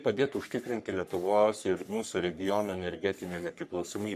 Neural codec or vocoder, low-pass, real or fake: codec, 44.1 kHz, 3.4 kbps, Pupu-Codec; 14.4 kHz; fake